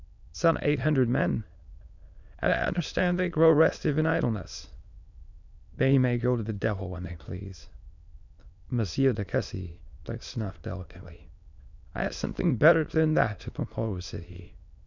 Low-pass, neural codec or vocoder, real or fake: 7.2 kHz; autoencoder, 22.05 kHz, a latent of 192 numbers a frame, VITS, trained on many speakers; fake